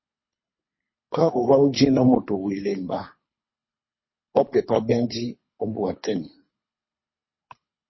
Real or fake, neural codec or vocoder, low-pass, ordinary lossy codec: fake; codec, 24 kHz, 3 kbps, HILCodec; 7.2 kHz; MP3, 24 kbps